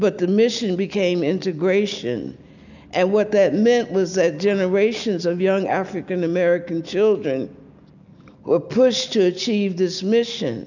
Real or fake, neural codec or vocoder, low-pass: real; none; 7.2 kHz